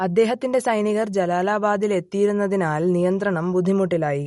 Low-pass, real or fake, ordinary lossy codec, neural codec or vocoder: 19.8 kHz; real; MP3, 48 kbps; none